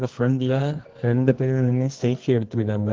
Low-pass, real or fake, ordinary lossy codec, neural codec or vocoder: 7.2 kHz; fake; Opus, 16 kbps; codec, 24 kHz, 0.9 kbps, WavTokenizer, medium music audio release